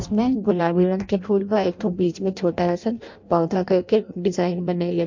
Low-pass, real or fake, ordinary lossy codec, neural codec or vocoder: 7.2 kHz; fake; MP3, 48 kbps; codec, 16 kHz in and 24 kHz out, 0.6 kbps, FireRedTTS-2 codec